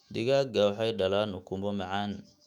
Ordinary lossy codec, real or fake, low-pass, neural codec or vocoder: none; fake; 19.8 kHz; autoencoder, 48 kHz, 128 numbers a frame, DAC-VAE, trained on Japanese speech